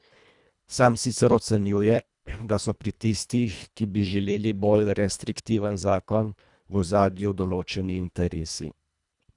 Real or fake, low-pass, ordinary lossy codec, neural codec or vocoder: fake; 10.8 kHz; none; codec, 24 kHz, 1.5 kbps, HILCodec